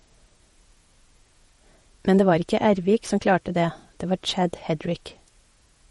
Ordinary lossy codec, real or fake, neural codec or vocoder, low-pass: MP3, 48 kbps; fake; vocoder, 48 kHz, 128 mel bands, Vocos; 19.8 kHz